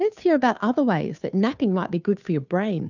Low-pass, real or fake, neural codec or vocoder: 7.2 kHz; fake; codec, 16 kHz, 2 kbps, FunCodec, trained on Chinese and English, 25 frames a second